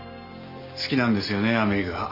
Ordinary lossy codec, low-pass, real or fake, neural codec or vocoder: Opus, 64 kbps; 5.4 kHz; real; none